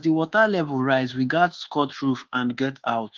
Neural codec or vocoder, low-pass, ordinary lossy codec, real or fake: codec, 24 kHz, 1.2 kbps, DualCodec; 7.2 kHz; Opus, 16 kbps; fake